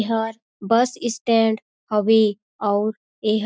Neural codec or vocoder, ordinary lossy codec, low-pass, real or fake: none; none; none; real